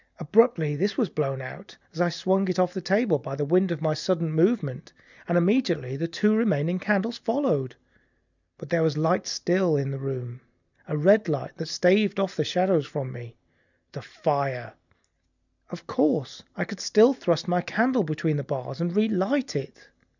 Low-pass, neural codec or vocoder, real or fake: 7.2 kHz; none; real